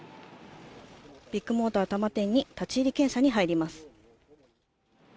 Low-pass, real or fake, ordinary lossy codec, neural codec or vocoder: none; real; none; none